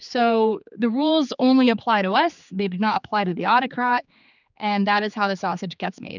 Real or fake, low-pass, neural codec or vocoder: fake; 7.2 kHz; codec, 16 kHz, 4 kbps, X-Codec, HuBERT features, trained on general audio